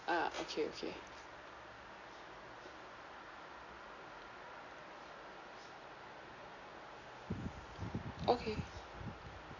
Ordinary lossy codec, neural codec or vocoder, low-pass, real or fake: none; none; 7.2 kHz; real